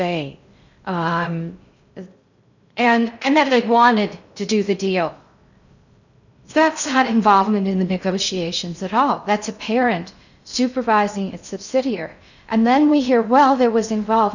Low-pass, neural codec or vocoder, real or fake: 7.2 kHz; codec, 16 kHz in and 24 kHz out, 0.6 kbps, FocalCodec, streaming, 2048 codes; fake